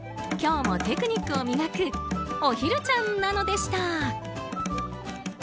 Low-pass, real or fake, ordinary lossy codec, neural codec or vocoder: none; real; none; none